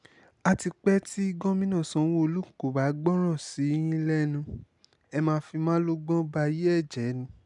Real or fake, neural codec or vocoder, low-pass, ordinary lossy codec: real; none; 10.8 kHz; none